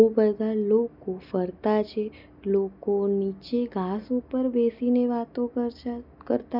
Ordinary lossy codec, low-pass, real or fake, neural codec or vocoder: none; 5.4 kHz; real; none